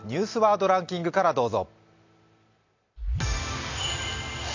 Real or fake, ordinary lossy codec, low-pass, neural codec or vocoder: real; AAC, 48 kbps; 7.2 kHz; none